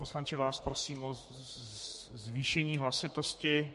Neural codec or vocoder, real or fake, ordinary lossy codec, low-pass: codec, 32 kHz, 1.9 kbps, SNAC; fake; MP3, 48 kbps; 14.4 kHz